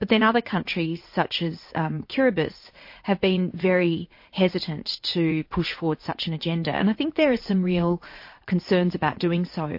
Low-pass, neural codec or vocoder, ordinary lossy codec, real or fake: 5.4 kHz; vocoder, 44.1 kHz, 80 mel bands, Vocos; MP3, 32 kbps; fake